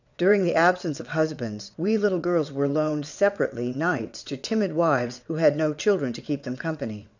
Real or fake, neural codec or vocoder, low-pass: fake; vocoder, 22.05 kHz, 80 mel bands, WaveNeXt; 7.2 kHz